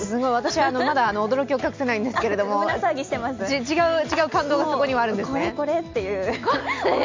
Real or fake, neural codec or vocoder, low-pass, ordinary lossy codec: real; none; 7.2 kHz; MP3, 64 kbps